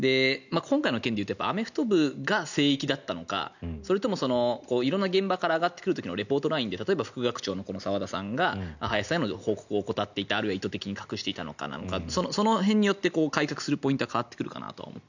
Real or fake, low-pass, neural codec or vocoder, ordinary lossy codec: real; 7.2 kHz; none; none